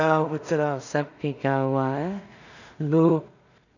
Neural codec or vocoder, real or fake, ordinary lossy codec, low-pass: codec, 16 kHz in and 24 kHz out, 0.4 kbps, LongCat-Audio-Codec, two codebook decoder; fake; none; 7.2 kHz